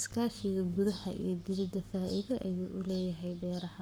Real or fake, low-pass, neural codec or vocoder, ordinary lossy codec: fake; none; codec, 44.1 kHz, 7.8 kbps, Pupu-Codec; none